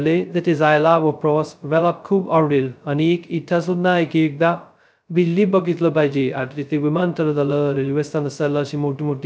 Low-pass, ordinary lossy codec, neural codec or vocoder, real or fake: none; none; codec, 16 kHz, 0.2 kbps, FocalCodec; fake